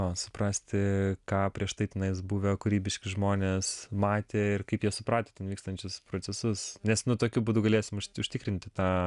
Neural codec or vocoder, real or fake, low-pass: none; real; 10.8 kHz